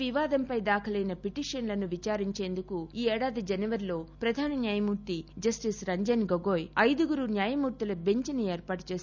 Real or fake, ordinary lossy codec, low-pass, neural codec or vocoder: real; none; 7.2 kHz; none